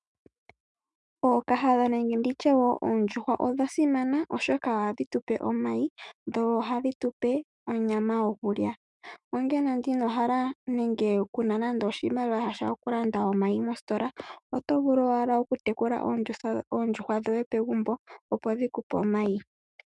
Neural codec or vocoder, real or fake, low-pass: autoencoder, 48 kHz, 128 numbers a frame, DAC-VAE, trained on Japanese speech; fake; 10.8 kHz